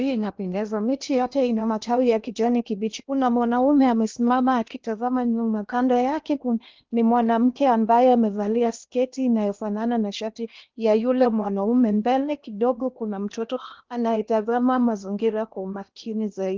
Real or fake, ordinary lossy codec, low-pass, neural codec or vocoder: fake; Opus, 32 kbps; 7.2 kHz; codec, 16 kHz in and 24 kHz out, 0.8 kbps, FocalCodec, streaming, 65536 codes